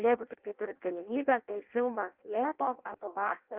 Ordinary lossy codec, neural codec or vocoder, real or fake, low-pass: Opus, 32 kbps; codec, 16 kHz in and 24 kHz out, 0.6 kbps, FireRedTTS-2 codec; fake; 3.6 kHz